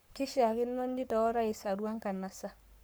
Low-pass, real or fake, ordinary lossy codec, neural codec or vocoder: none; fake; none; codec, 44.1 kHz, 7.8 kbps, Pupu-Codec